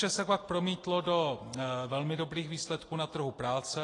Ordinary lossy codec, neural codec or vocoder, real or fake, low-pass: AAC, 32 kbps; none; real; 10.8 kHz